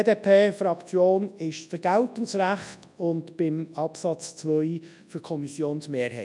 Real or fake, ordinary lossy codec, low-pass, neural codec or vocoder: fake; none; 10.8 kHz; codec, 24 kHz, 0.9 kbps, WavTokenizer, large speech release